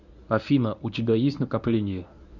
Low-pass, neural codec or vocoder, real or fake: 7.2 kHz; codec, 24 kHz, 0.9 kbps, WavTokenizer, medium speech release version 1; fake